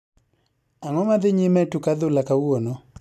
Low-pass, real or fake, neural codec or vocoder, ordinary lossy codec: 14.4 kHz; real; none; none